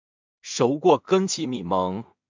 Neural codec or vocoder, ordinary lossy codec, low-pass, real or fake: codec, 16 kHz in and 24 kHz out, 0.4 kbps, LongCat-Audio-Codec, two codebook decoder; MP3, 64 kbps; 7.2 kHz; fake